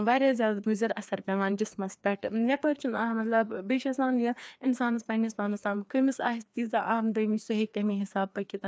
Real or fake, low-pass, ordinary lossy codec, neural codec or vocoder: fake; none; none; codec, 16 kHz, 2 kbps, FreqCodec, larger model